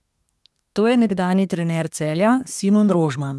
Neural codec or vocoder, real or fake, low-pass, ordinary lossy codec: codec, 24 kHz, 1 kbps, SNAC; fake; none; none